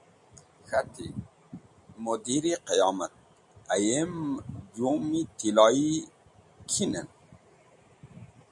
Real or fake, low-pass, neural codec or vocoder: real; 10.8 kHz; none